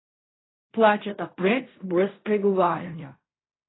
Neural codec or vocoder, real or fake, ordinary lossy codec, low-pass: codec, 16 kHz in and 24 kHz out, 0.4 kbps, LongCat-Audio-Codec, fine tuned four codebook decoder; fake; AAC, 16 kbps; 7.2 kHz